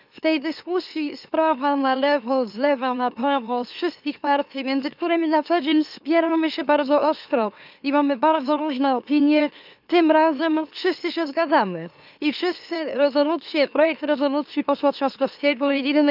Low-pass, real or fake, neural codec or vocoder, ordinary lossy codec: 5.4 kHz; fake; autoencoder, 44.1 kHz, a latent of 192 numbers a frame, MeloTTS; none